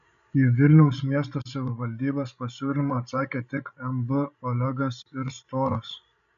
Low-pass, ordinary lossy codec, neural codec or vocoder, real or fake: 7.2 kHz; MP3, 96 kbps; codec, 16 kHz, 16 kbps, FreqCodec, larger model; fake